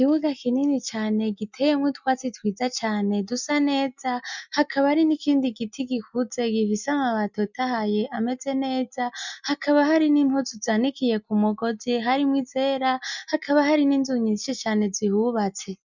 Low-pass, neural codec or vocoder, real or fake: 7.2 kHz; none; real